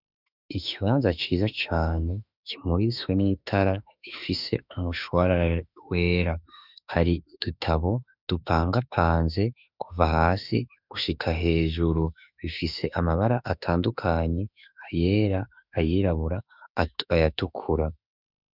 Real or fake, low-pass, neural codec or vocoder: fake; 5.4 kHz; autoencoder, 48 kHz, 32 numbers a frame, DAC-VAE, trained on Japanese speech